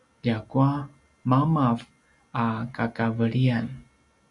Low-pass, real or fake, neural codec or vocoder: 10.8 kHz; real; none